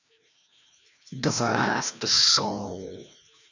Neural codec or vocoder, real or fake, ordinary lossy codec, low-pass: codec, 16 kHz, 1 kbps, FreqCodec, larger model; fake; none; 7.2 kHz